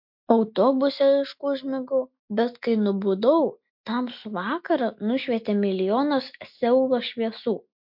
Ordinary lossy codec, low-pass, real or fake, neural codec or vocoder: MP3, 48 kbps; 5.4 kHz; real; none